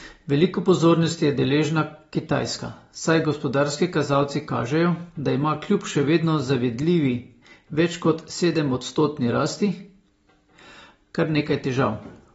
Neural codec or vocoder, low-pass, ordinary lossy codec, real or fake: none; 19.8 kHz; AAC, 24 kbps; real